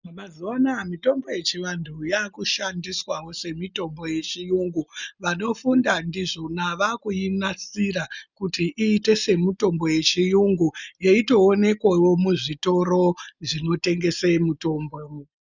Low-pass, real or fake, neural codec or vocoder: 7.2 kHz; real; none